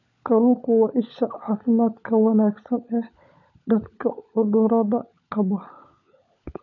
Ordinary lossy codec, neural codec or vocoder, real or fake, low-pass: none; codec, 16 kHz, 16 kbps, FunCodec, trained on LibriTTS, 50 frames a second; fake; 7.2 kHz